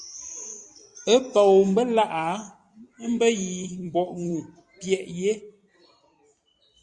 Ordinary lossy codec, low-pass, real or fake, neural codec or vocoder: Opus, 64 kbps; 10.8 kHz; fake; vocoder, 44.1 kHz, 128 mel bands every 256 samples, BigVGAN v2